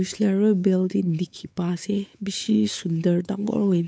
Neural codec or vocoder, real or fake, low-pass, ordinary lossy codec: codec, 16 kHz, 4 kbps, X-Codec, WavLM features, trained on Multilingual LibriSpeech; fake; none; none